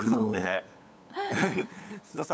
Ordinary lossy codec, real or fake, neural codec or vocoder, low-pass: none; fake; codec, 16 kHz, 8 kbps, FunCodec, trained on LibriTTS, 25 frames a second; none